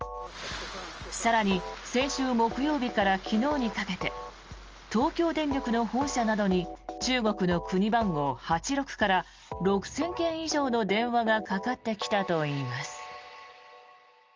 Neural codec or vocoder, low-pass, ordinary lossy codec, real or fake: none; 7.2 kHz; Opus, 24 kbps; real